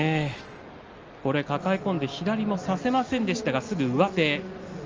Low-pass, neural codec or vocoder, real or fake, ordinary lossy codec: 7.2 kHz; none; real; Opus, 24 kbps